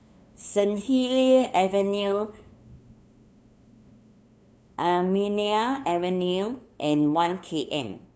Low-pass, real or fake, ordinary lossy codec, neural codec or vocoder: none; fake; none; codec, 16 kHz, 2 kbps, FunCodec, trained on LibriTTS, 25 frames a second